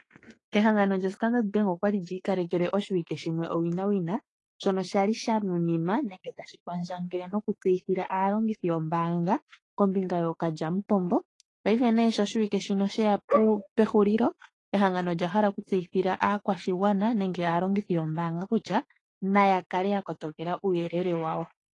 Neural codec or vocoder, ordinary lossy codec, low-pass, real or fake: autoencoder, 48 kHz, 32 numbers a frame, DAC-VAE, trained on Japanese speech; AAC, 32 kbps; 10.8 kHz; fake